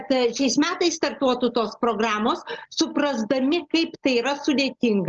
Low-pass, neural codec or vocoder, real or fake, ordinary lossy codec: 7.2 kHz; none; real; Opus, 24 kbps